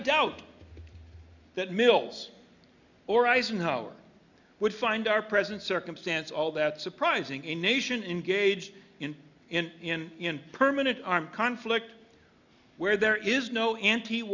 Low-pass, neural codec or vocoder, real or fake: 7.2 kHz; none; real